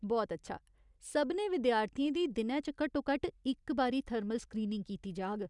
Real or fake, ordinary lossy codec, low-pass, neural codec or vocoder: real; none; 10.8 kHz; none